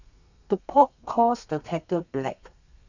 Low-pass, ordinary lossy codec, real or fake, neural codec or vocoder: 7.2 kHz; none; fake; codec, 32 kHz, 1.9 kbps, SNAC